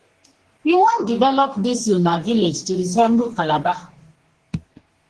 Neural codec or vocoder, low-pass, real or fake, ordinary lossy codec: codec, 44.1 kHz, 2.6 kbps, DAC; 10.8 kHz; fake; Opus, 16 kbps